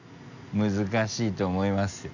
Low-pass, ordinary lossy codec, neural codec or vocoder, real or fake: 7.2 kHz; none; none; real